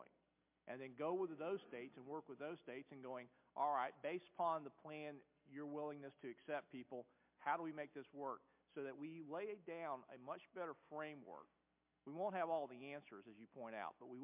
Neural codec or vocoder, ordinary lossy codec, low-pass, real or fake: none; MP3, 32 kbps; 3.6 kHz; real